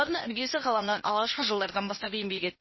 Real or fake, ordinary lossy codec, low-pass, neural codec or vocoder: fake; MP3, 24 kbps; 7.2 kHz; codec, 16 kHz, 0.8 kbps, ZipCodec